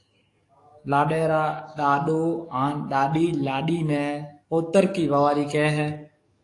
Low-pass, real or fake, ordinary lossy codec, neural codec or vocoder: 10.8 kHz; fake; AAC, 48 kbps; codec, 44.1 kHz, 7.8 kbps, DAC